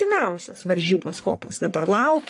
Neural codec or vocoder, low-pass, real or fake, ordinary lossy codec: codec, 44.1 kHz, 1.7 kbps, Pupu-Codec; 10.8 kHz; fake; AAC, 64 kbps